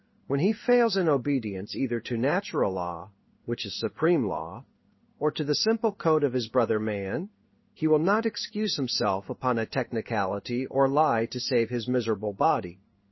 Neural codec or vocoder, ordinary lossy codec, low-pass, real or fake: codec, 16 kHz in and 24 kHz out, 1 kbps, XY-Tokenizer; MP3, 24 kbps; 7.2 kHz; fake